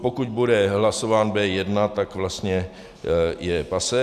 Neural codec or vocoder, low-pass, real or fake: none; 14.4 kHz; real